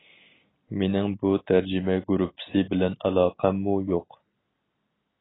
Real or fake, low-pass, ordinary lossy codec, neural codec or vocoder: real; 7.2 kHz; AAC, 16 kbps; none